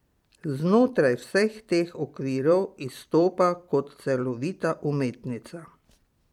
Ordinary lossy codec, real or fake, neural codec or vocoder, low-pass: MP3, 96 kbps; fake; vocoder, 44.1 kHz, 128 mel bands every 256 samples, BigVGAN v2; 19.8 kHz